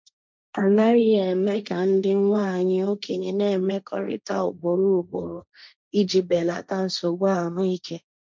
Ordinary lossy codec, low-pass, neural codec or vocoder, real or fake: none; none; codec, 16 kHz, 1.1 kbps, Voila-Tokenizer; fake